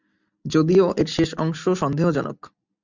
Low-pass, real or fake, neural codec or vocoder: 7.2 kHz; real; none